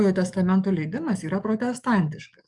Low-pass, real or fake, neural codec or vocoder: 10.8 kHz; fake; codec, 44.1 kHz, 7.8 kbps, DAC